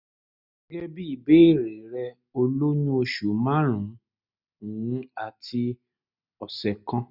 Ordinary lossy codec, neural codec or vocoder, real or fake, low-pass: none; none; real; 5.4 kHz